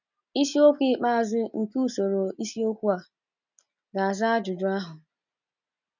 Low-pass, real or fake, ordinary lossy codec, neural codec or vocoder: 7.2 kHz; real; none; none